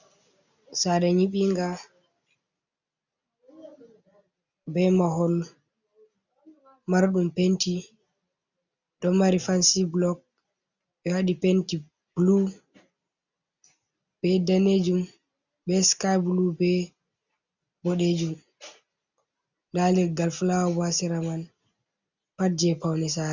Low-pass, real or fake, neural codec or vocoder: 7.2 kHz; real; none